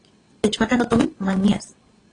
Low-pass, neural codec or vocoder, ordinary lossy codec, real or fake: 9.9 kHz; vocoder, 22.05 kHz, 80 mel bands, WaveNeXt; AAC, 32 kbps; fake